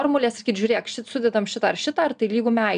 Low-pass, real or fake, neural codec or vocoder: 9.9 kHz; real; none